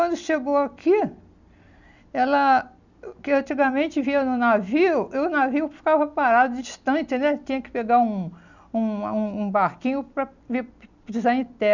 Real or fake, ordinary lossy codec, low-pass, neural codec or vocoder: real; none; 7.2 kHz; none